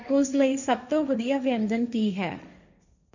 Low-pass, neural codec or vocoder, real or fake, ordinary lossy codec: 7.2 kHz; codec, 16 kHz, 1.1 kbps, Voila-Tokenizer; fake; none